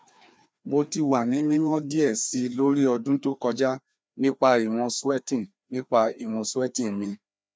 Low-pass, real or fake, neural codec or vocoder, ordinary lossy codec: none; fake; codec, 16 kHz, 2 kbps, FreqCodec, larger model; none